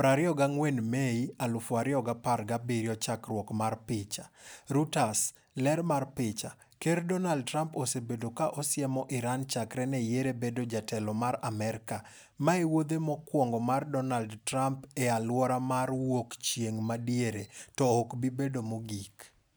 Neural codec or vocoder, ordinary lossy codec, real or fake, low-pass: none; none; real; none